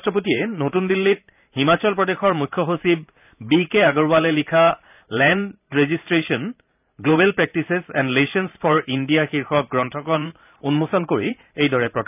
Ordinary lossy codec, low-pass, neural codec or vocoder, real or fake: none; 3.6 kHz; vocoder, 44.1 kHz, 128 mel bands every 512 samples, BigVGAN v2; fake